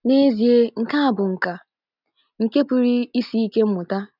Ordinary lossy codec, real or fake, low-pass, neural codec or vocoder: none; real; 5.4 kHz; none